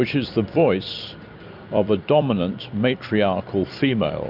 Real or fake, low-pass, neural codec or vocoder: fake; 5.4 kHz; vocoder, 44.1 kHz, 128 mel bands every 256 samples, BigVGAN v2